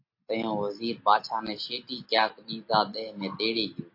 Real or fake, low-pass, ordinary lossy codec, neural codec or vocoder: real; 5.4 kHz; MP3, 32 kbps; none